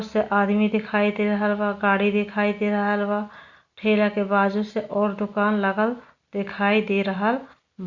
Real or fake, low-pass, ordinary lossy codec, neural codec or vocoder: real; 7.2 kHz; Opus, 64 kbps; none